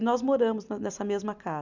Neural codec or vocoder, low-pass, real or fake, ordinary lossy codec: none; 7.2 kHz; real; none